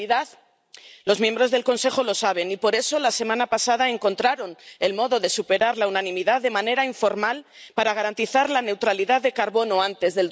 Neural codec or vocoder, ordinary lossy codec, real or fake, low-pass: none; none; real; none